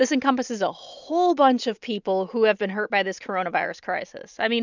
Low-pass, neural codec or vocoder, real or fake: 7.2 kHz; none; real